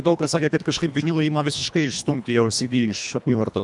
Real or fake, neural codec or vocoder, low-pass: fake; codec, 24 kHz, 1.5 kbps, HILCodec; 10.8 kHz